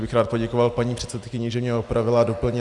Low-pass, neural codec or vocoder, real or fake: 10.8 kHz; none; real